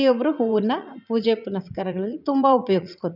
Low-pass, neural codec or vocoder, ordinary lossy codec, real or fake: 5.4 kHz; vocoder, 44.1 kHz, 80 mel bands, Vocos; none; fake